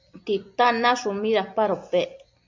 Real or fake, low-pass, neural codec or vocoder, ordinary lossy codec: real; 7.2 kHz; none; MP3, 64 kbps